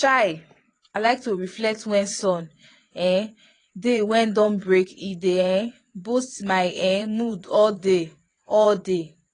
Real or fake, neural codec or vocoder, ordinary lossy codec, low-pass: fake; vocoder, 22.05 kHz, 80 mel bands, WaveNeXt; AAC, 32 kbps; 9.9 kHz